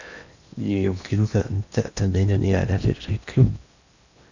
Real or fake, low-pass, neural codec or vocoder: fake; 7.2 kHz; codec, 16 kHz in and 24 kHz out, 0.8 kbps, FocalCodec, streaming, 65536 codes